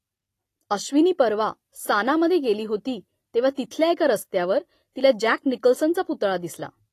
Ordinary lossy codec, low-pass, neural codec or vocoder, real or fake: AAC, 48 kbps; 14.4 kHz; none; real